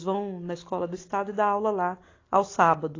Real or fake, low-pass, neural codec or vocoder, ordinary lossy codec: real; 7.2 kHz; none; AAC, 32 kbps